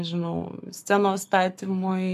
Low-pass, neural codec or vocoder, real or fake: 14.4 kHz; codec, 44.1 kHz, 7.8 kbps, Pupu-Codec; fake